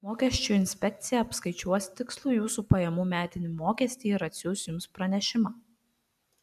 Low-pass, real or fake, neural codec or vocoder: 14.4 kHz; real; none